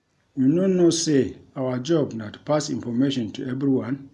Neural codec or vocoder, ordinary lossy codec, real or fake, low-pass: none; none; real; none